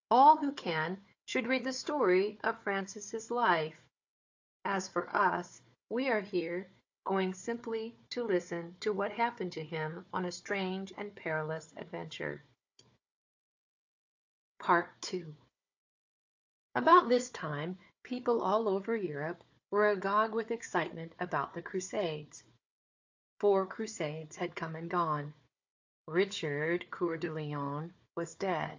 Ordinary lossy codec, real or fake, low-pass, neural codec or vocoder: AAC, 48 kbps; fake; 7.2 kHz; codec, 16 kHz, 4 kbps, FunCodec, trained on Chinese and English, 50 frames a second